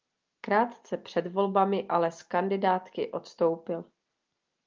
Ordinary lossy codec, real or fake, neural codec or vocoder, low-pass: Opus, 24 kbps; real; none; 7.2 kHz